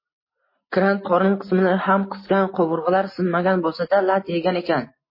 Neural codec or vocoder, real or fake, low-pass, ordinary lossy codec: none; real; 5.4 kHz; MP3, 24 kbps